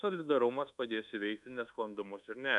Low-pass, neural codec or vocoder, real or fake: 10.8 kHz; codec, 24 kHz, 1.2 kbps, DualCodec; fake